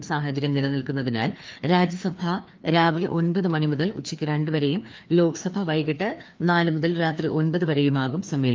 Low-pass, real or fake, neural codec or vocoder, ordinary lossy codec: 7.2 kHz; fake; codec, 16 kHz, 2 kbps, FreqCodec, larger model; Opus, 24 kbps